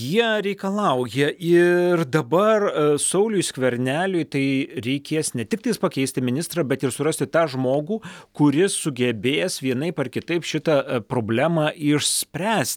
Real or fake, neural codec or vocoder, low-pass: real; none; 19.8 kHz